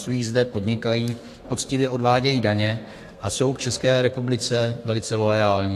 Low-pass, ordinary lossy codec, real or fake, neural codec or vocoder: 14.4 kHz; AAC, 64 kbps; fake; codec, 32 kHz, 1.9 kbps, SNAC